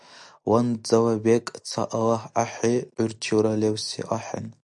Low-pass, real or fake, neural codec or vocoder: 10.8 kHz; real; none